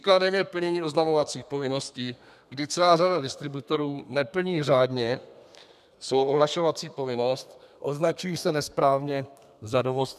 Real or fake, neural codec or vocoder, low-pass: fake; codec, 32 kHz, 1.9 kbps, SNAC; 14.4 kHz